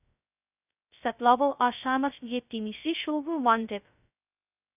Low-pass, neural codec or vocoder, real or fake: 3.6 kHz; codec, 16 kHz, 0.2 kbps, FocalCodec; fake